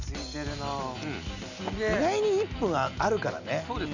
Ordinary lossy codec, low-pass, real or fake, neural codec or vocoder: none; 7.2 kHz; real; none